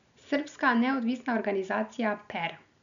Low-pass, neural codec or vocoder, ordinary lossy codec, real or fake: 7.2 kHz; none; none; real